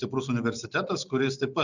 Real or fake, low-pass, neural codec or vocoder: real; 7.2 kHz; none